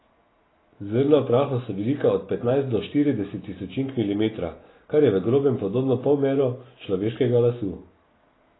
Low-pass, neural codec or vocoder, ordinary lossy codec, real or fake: 7.2 kHz; none; AAC, 16 kbps; real